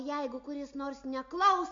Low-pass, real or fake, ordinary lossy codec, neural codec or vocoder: 7.2 kHz; real; AAC, 48 kbps; none